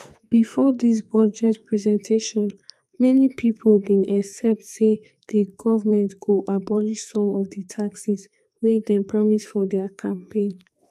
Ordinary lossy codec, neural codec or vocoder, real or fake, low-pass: none; codec, 44.1 kHz, 2.6 kbps, SNAC; fake; 14.4 kHz